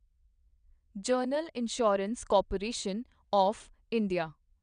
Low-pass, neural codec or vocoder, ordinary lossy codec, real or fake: 9.9 kHz; vocoder, 22.05 kHz, 80 mel bands, WaveNeXt; none; fake